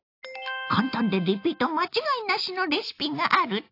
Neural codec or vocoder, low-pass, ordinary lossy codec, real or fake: none; 5.4 kHz; AAC, 32 kbps; real